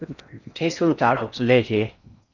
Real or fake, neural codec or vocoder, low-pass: fake; codec, 16 kHz in and 24 kHz out, 0.6 kbps, FocalCodec, streaming, 4096 codes; 7.2 kHz